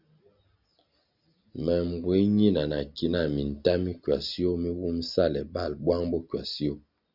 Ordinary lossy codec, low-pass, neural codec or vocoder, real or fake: Opus, 64 kbps; 5.4 kHz; none; real